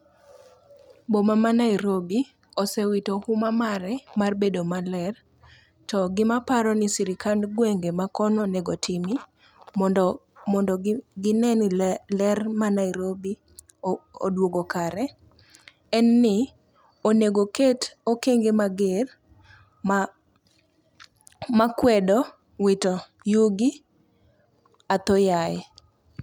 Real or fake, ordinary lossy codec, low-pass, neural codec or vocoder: real; none; 19.8 kHz; none